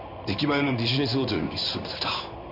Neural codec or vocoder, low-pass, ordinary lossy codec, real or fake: codec, 16 kHz in and 24 kHz out, 1 kbps, XY-Tokenizer; 5.4 kHz; none; fake